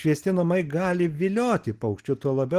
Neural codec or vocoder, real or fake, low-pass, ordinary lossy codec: none; real; 14.4 kHz; Opus, 16 kbps